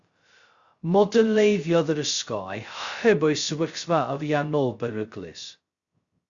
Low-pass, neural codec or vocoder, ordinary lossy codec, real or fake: 7.2 kHz; codec, 16 kHz, 0.2 kbps, FocalCodec; Opus, 64 kbps; fake